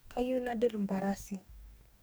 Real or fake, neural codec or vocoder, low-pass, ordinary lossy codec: fake; codec, 44.1 kHz, 2.6 kbps, DAC; none; none